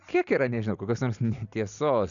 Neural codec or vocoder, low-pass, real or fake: none; 7.2 kHz; real